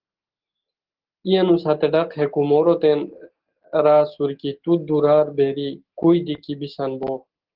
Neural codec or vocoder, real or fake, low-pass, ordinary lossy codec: none; real; 5.4 kHz; Opus, 16 kbps